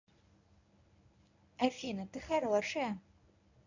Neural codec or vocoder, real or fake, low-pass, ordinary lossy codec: codec, 24 kHz, 0.9 kbps, WavTokenizer, medium speech release version 1; fake; 7.2 kHz; none